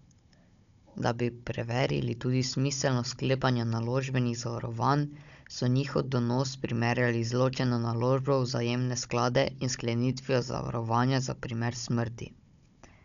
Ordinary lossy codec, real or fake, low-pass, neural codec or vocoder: none; fake; 7.2 kHz; codec, 16 kHz, 16 kbps, FunCodec, trained on Chinese and English, 50 frames a second